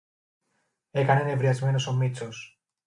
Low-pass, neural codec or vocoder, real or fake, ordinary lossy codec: 10.8 kHz; none; real; MP3, 64 kbps